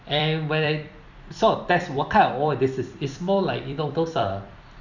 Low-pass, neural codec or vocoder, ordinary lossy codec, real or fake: 7.2 kHz; vocoder, 44.1 kHz, 128 mel bands every 256 samples, BigVGAN v2; none; fake